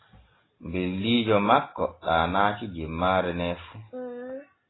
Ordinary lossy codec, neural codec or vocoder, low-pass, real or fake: AAC, 16 kbps; none; 7.2 kHz; real